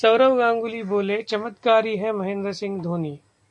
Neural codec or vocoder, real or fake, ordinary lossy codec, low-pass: none; real; AAC, 64 kbps; 10.8 kHz